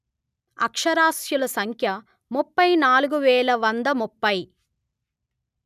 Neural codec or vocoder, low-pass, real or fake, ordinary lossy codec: none; 14.4 kHz; real; none